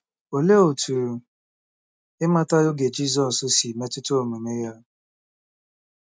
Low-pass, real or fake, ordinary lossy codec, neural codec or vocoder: none; real; none; none